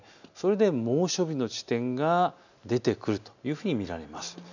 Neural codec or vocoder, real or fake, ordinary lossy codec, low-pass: none; real; none; 7.2 kHz